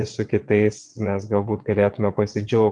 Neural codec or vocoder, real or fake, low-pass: vocoder, 22.05 kHz, 80 mel bands, WaveNeXt; fake; 9.9 kHz